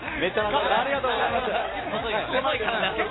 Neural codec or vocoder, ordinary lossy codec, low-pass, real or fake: none; AAC, 16 kbps; 7.2 kHz; real